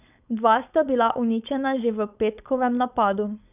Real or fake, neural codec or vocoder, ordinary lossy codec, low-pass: fake; codec, 16 kHz, 4.8 kbps, FACodec; none; 3.6 kHz